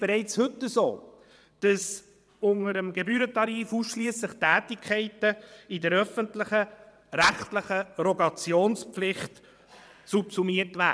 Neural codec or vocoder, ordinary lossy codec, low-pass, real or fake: vocoder, 22.05 kHz, 80 mel bands, WaveNeXt; none; none; fake